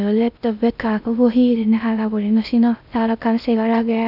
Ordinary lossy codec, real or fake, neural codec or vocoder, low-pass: none; fake; codec, 16 kHz in and 24 kHz out, 0.6 kbps, FocalCodec, streaming, 2048 codes; 5.4 kHz